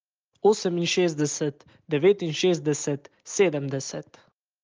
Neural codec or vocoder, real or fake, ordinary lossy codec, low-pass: none; real; Opus, 24 kbps; 7.2 kHz